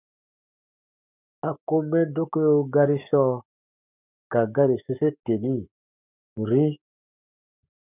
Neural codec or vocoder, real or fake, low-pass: codec, 44.1 kHz, 7.8 kbps, Pupu-Codec; fake; 3.6 kHz